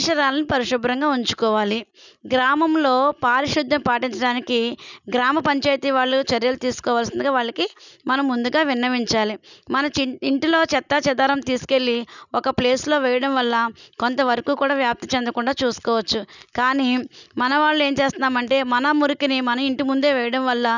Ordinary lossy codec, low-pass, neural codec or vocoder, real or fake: none; 7.2 kHz; none; real